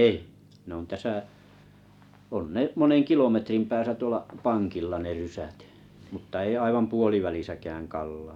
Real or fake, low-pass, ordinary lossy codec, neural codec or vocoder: real; 19.8 kHz; none; none